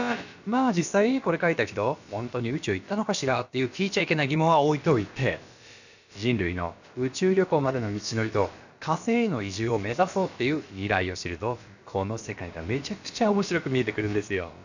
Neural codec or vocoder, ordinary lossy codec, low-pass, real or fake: codec, 16 kHz, about 1 kbps, DyCAST, with the encoder's durations; none; 7.2 kHz; fake